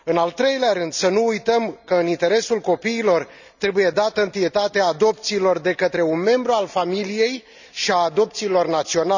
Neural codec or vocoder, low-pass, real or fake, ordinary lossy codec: none; 7.2 kHz; real; none